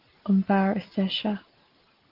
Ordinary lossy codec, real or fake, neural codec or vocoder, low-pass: Opus, 16 kbps; real; none; 5.4 kHz